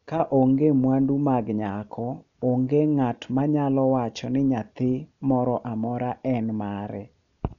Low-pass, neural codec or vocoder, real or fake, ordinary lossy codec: 7.2 kHz; none; real; none